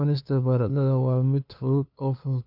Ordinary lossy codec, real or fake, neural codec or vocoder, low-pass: none; fake; codec, 16 kHz, 2 kbps, FunCodec, trained on LibriTTS, 25 frames a second; 5.4 kHz